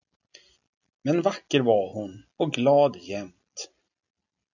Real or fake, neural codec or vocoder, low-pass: real; none; 7.2 kHz